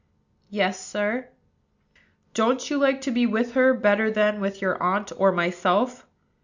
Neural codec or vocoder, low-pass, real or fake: none; 7.2 kHz; real